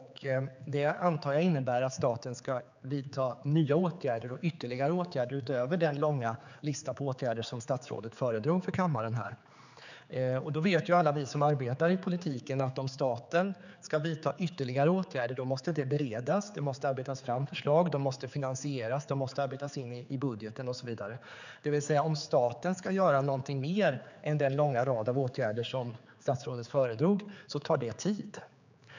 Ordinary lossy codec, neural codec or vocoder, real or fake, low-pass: none; codec, 16 kHz, 4 kbps, X-Codec, HuBERT features, trained on general audio; fake; 7.2 kHz